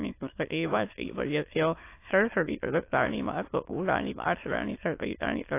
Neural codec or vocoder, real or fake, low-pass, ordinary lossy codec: autoencoder, 22.05 kHz, a latent of 192 numbers a frame, VITS, trained on many speakers; fake; 3.6 kHz; AAC, 24 kbps